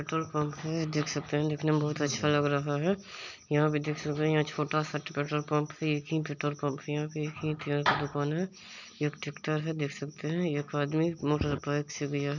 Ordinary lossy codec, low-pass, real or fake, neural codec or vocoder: none; 7.2 kHz; real; none